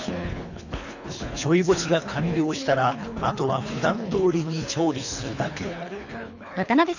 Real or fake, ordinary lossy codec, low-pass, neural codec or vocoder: fake; none; 7.2 kHz; codec, 24 kHz, 3 kbps, HILCodec